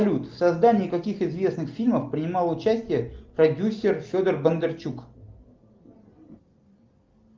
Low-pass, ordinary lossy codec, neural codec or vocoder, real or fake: 7.2 kHz; Opus, 24 kbps; none; real